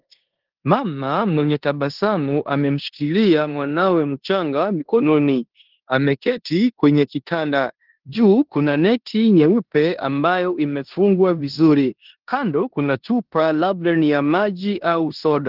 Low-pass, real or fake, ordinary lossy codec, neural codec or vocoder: 5.4 kHz; fake; Opus, 16 kbps; codec, 16 kHz in and 24 kHz out, 0.9 kbps, LongCat-Audio-Codec, four codebook decoder